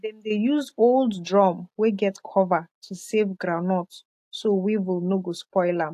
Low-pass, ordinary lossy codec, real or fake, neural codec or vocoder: 14.4 kHz; AAC, 64 kbps; real; none